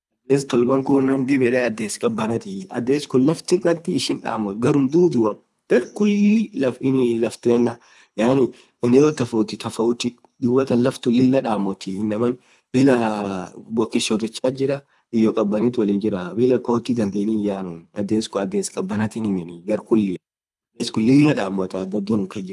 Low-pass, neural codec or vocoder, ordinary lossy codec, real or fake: none; codec, 24 kHz, 3 kbps, HILCodec; none; fake